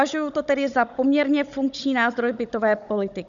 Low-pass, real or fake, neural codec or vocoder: 7.2 kHz; fake; codec, 16 kHz, 16 kbps, FunCodec, trained on Chinese and English, 50 frames a second